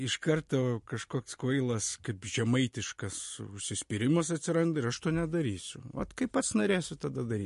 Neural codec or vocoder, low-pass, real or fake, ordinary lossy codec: none; 14.4 kHz; real; MP3, 48 kbps